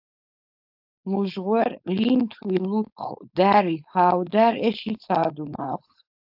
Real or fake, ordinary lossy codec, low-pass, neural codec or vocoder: fake; AAC, 48 kbps; 5.4 kHz; codec, 16 kHz, 4.8 kbps, FACodec